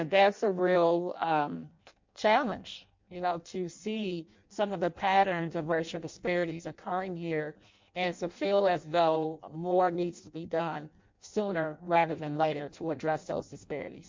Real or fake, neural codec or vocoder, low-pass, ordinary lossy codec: fake; codec, 16 kHz in and 24 kHz out, 0.6 kbps, FireRedTTS-2 codec; 7.2 kHz; MP3, 48 kbps